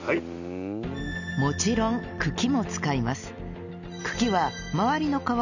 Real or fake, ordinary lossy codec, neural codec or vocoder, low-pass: real; none; none; 7.2 kHz